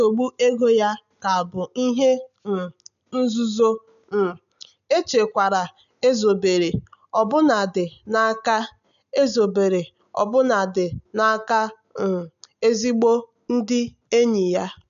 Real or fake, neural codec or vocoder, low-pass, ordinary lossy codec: real; none; 7.2 kHz; none